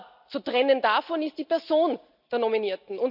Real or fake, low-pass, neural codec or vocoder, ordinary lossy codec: real; 5.4 kHz; none; none